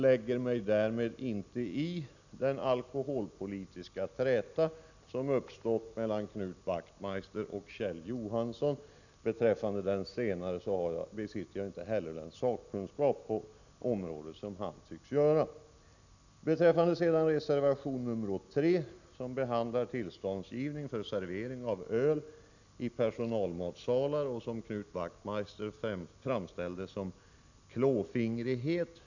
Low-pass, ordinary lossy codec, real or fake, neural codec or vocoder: 7.2 kHz; none; real; none